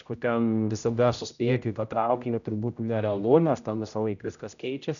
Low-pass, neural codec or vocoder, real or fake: 7.2 kHz; codec, 16 kHz, 0.5 kbps, X-Codec, HuBERT features, trained on general audio; fake